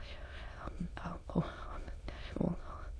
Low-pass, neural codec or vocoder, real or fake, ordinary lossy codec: none; autoencoder, 22.05 kHz, a latent of 192 numbers a frame, VITS, trained on many speakers; fake; none